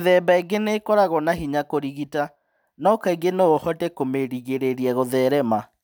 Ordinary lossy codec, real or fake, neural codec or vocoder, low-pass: none; real; none; none